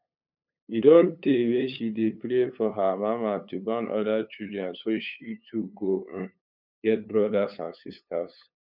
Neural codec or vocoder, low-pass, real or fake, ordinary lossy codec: codec, 16 kHz, 8 kbps, FunCodec, trained on LibriTTS, 25 frames a second; 5.4 kHz; fake; none